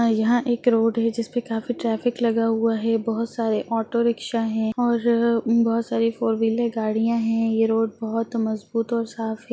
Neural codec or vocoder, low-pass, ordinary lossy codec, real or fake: none; none; none; real